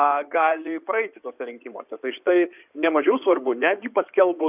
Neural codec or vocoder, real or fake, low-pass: codec, 16 kHz in and 24 kHz out, 2.2 kbps, FireRedTTS-2 codec; fake; 3.6 kHz